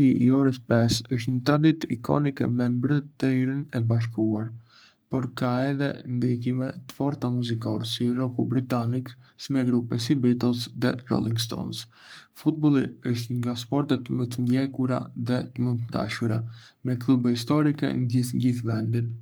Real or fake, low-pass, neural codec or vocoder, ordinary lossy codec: fake; none; codec, 44.1 kHz, 3.4 kbps, Pupu-Codec; none